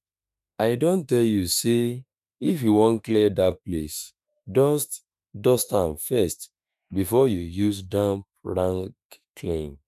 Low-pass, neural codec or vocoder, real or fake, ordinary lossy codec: 14.4 kHz; autoencoder, 48 kHz, 32 numbers a frame, DAC-VAE, trained on Japanese speech; fake; none